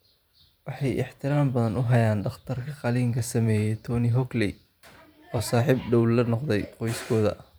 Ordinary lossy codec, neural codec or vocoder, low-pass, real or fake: none; vocoder, 44.1 kHz, 128 mel bands every 512 samples, BigVGAN v2; none; fake